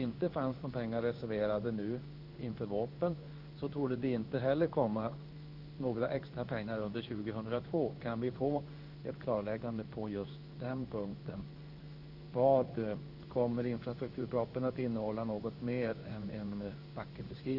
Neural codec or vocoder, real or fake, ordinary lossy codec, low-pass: codec, 16 kHz in and 24 kHz out, 1 kbps, XY-Tokenizer; fake; Opus, 32 kbps; 5.4 kHz